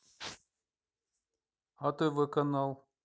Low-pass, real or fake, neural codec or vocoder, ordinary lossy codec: none; real; none; none